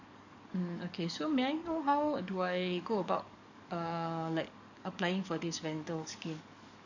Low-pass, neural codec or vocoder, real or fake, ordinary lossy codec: 7.2 kHz; codec, 44.1 kHz, 7.8 kbps, DAC; fake; none